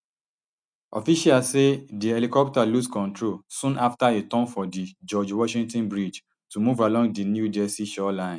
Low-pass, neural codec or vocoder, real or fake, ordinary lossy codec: 9.9 kHz; none; real; none